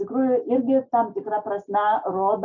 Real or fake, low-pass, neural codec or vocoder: real; 7.2 kHz; none